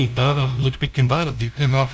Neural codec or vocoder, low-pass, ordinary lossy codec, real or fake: codec, 16 kHz, 0.5 kbps, FunCodec, trained on LibriTTS, 25 frames a second; none; none; fake